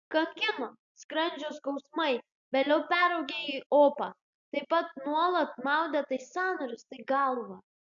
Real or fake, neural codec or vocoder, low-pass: real; none; 7.2 kHz